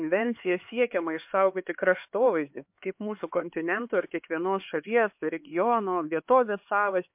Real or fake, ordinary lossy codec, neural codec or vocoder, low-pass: fake; MP3, 32 kbps; codec, 16 kHz, 4 kbps, X-Codec, HuBERT features, trained on LibriSpeech; 3.6 kHz